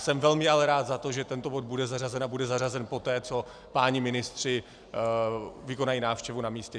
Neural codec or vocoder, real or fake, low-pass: none; real; 9.9 kHz